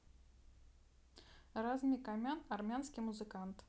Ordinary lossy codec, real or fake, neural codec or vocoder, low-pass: none; real; none; none